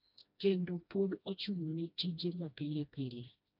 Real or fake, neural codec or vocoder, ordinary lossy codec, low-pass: fake; codec, 16 kHz, 1 kbps, FreqCodec, smaller model; MP3, 48 kbps; 5.4 kHz